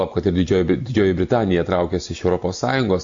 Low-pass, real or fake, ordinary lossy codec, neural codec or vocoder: 7.2 kHz; real; MP3, 48 kbps; none